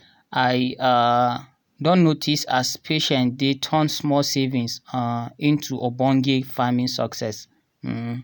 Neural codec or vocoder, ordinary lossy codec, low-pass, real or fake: none; none; none; real